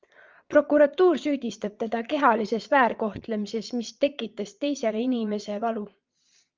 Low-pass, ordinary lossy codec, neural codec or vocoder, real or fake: 7.2 kHz; Opus, 24 kbps; vocoder, 22.05 kHz, 80 mel bands, Vocos; fake